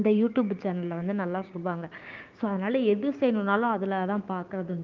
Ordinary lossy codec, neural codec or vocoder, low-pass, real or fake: Opus, 32 kbps; codec, 16 kHz, 6 kbps, DAC; 7.2 kHz; fake